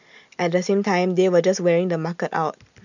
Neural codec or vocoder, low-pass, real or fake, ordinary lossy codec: none; 7.2 kHz; real; none